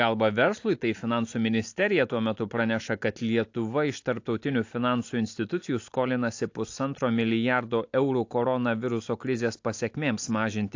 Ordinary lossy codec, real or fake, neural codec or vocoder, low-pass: AAC, 48 kbps; real; none; 7.2 kHz